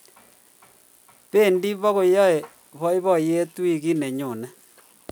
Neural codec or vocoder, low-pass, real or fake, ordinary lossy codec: none; none; real; none